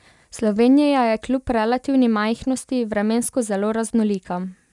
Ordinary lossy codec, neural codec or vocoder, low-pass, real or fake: none; none; 10.8 kHz; real